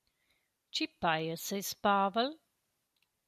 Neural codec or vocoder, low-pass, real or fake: none; 14.4 kHz; real